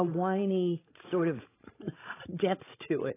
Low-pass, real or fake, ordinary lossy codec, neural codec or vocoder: 3.6 kHz; fake; AAC, 16 kbps; codec, 16 kHz, 16 kbps, FreqCodec, larger model